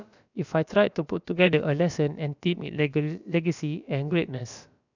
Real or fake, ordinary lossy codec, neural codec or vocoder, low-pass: fake; none; codec, 16 kHz, about 1 kbps, DyCAST, with the encoder's durations; 7.2 kHz